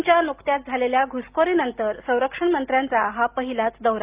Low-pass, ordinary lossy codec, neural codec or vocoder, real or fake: 3.6 kHz; Opus, 24 kbps; none; real